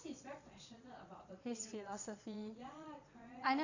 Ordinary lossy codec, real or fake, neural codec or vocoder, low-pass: none; fake; vocoder, 22.05 kHz, 80 mel bands, Vocos; 7.2 kHz